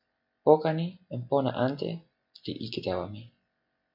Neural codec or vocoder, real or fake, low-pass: none; real; 5.4 kHz